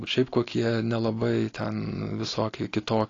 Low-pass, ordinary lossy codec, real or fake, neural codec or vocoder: 7.2 kHz; AAC, 32 kbps; real; none